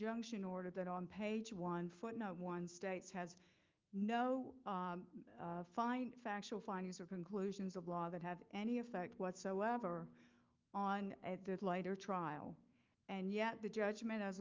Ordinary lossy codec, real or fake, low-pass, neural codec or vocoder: Opus, 32 kbps; fake; 7.2 kHz; autoencoder, 48 kHz, 128 numbers a frame, DAC-VAE, trained on Japanese speech